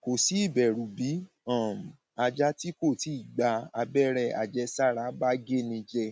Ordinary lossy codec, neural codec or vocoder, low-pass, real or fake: none; none; none; real